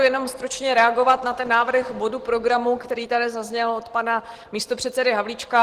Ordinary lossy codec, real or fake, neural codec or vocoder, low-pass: Opus, 16 kbps; real; none; 14.4 kHz